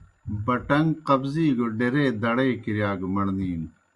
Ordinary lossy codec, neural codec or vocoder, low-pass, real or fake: Opus, 64 kbps; none; 10.8 kHz; real